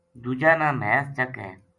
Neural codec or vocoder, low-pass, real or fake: none; 10.8 kHz; real